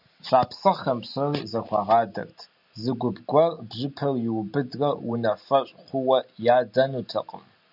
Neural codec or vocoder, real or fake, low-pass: none; real; 5.4 kHz